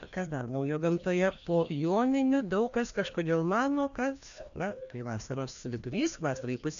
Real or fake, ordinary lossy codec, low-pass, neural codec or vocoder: fake; AAC, 96 kbps; 7.2 kHz; codec, 16 kHz, 1 kbps, FreqCodec, larger model